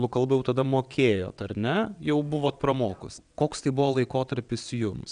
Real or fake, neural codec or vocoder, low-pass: fake; vocoder, 22.05 kHz, 80 mel bands, WaveNeXt; 9.9 kHz